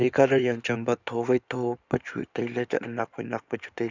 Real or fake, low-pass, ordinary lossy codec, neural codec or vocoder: fake; 7.2 kHz; none; codec, 16 kHz in and 24 kHz out, 2.2 kbps, FireRedTTS-2 codec